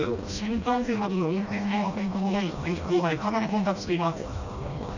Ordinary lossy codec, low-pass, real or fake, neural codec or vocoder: none; 7.2 kHz; fake; codec, 16 kHz, 1 kbps, FreqCodec, smaller model